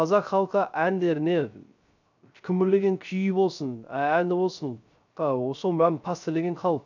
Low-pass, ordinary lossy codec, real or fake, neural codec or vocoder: 7.2 kHz; none; fake; codec, 16 kHz, 0.3 kbps, FocalCodec